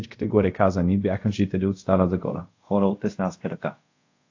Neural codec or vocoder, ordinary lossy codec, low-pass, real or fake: codec, 24 kHz, 0.5 kbps, DualCodec; AAC, 48 kbps; 7.2 kHz; fake